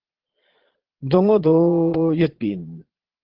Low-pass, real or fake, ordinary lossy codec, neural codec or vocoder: 5.4 kHz; fake; Opus, 16 kbps; vocoder, 22.05 kHz, 80 mel bands, Vocos